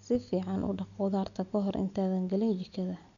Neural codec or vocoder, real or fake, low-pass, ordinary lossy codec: none; real; 7.2 kHz; none